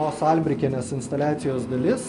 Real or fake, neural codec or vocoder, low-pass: real; none; 10.8 kHz